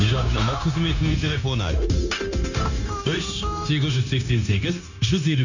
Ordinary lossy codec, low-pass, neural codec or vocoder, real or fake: none; 7.2 kHz; codec, 16 kHz, 0.9 kbps, LongCat-Audio-Codec; fake